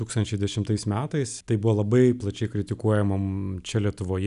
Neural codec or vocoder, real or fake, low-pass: none; real; 10.8 kHz